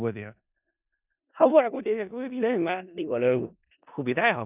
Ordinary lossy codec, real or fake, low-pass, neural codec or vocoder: none; fake; 3.6 kHz; codec, 16 kHz in and 24 kHz out, 0.4 kbps, LongCat-Audio-Codec, four codebook decoder